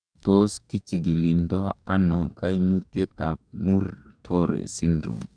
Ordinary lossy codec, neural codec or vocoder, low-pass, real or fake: none; codec, 44.1 kHz, 2.6 kbps, DAC; 9.9 kHz; fake